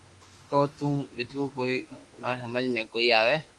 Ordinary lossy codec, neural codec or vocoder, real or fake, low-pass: Opus, 32 kbps; autoencoder, 48 kHz, 32 numbers a frame, DAC-VAE, trained on Japanese speech; fake; 10.8 kHz